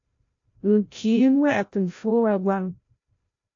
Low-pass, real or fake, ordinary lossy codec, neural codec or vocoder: 7.2 kHz; fake; AAC, 48 kbps; codec, 16 kHz, 0.5 kbps, FreqCodec, larger model